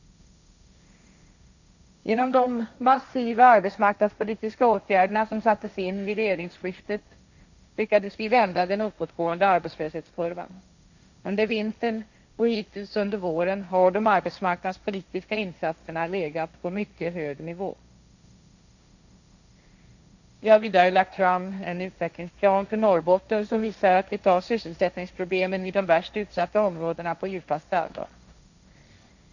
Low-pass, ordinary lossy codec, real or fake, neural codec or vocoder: 7.2 kHz; none; fake; codec, 16 kHz, 1.1 kbps, Voila-Tokenizer